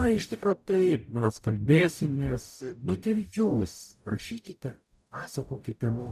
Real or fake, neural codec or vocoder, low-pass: fake; codec, 44.1 kHz, 0.9 kbps, DAC; 14.4 kHz